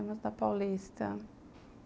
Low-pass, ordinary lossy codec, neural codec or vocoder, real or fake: none; none; none; real